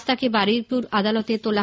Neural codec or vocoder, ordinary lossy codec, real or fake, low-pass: none; none; real; none